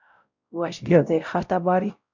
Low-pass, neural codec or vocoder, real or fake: 7.2 kHz; codec, 16 kHz, 0.5 kbps, X-Codec, WavLM features, trained on Multilingual LibriSpeech; fake